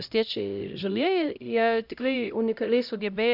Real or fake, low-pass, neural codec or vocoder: fake; 5.4 kHz; codec, 16 kHz, 0.5 kbps, X-Codec, HuBERT features, trained on LibriSpeech